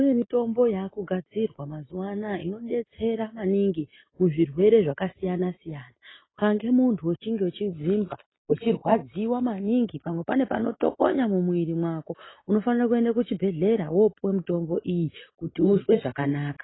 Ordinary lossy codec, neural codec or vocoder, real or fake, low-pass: AAC, 16 kbps; none; real; 7.2 kHz